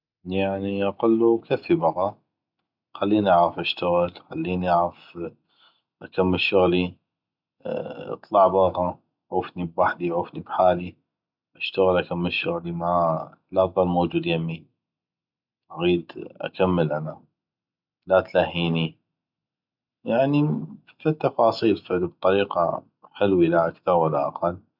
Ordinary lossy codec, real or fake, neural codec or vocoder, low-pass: none; real; none; 5.4 kHz